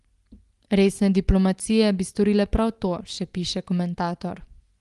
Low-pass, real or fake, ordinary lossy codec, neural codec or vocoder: 10.8 kHz; real; Opus, 24 kbps; none